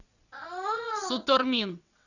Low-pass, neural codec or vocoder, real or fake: 7.2 kHz; vocoder, 22.05 kHz, 80 mel bands, WaveNeXt; fake